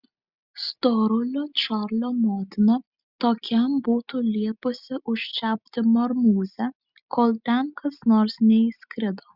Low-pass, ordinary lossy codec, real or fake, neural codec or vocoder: 5.4 kHz; Opus, 64 kbps; real; none